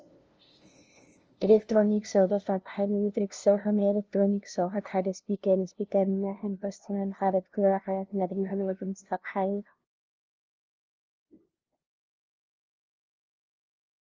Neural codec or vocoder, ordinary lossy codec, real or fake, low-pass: codec, 16 kHz, 0.5 kbps, FunCodec, trained on LibriTTS, 25 frames a second; Opus, 24 kbps; fake; 7.2 kHz